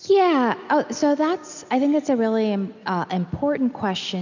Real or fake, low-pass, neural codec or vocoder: real; 7.2 kHz; none